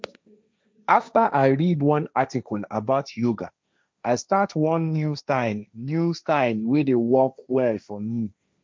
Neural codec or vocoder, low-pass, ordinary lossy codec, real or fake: codec, 16 kHz, 1.1 kbps, Voila-Tokenizer; none; none; fake